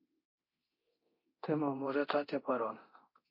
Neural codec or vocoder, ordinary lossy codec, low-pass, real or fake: codec, 24 kHz, 0.9 kbps, DualCodec; MP3, 32 kbps; 5.4 kHz; fake